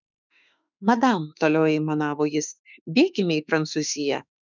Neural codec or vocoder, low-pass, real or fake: autoencoder, 48 kHz, 32 numbers a frame, DAC-VAE, trained on Japanese speech; 7.2 kHz; fake